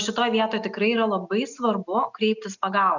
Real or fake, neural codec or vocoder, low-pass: real; none; 7.2 kHz